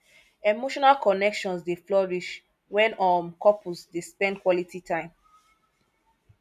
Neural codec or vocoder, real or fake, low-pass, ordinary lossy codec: none; real; 14.4 kHz; none